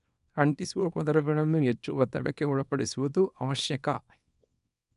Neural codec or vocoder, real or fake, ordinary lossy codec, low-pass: codec, 24 kHz, 0.9 kbps, WavTokenizer, small release; fake; none; 10.8 kHz